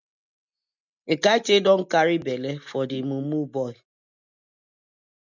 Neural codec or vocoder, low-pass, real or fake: none; 7.2 kHz; real